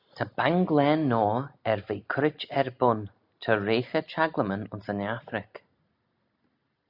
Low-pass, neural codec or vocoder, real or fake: 5.4 kHz; none; real